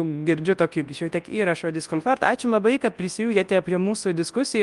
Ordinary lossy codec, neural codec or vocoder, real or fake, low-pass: Opus, 32 kbps; codec, 24 kHz, 0.9 kbps, WavTokenizer, large speech release; fake; 10.8 kHz